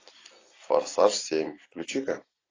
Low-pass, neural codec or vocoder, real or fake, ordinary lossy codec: 7.2 kHz; none; real; AAC, 32 kbps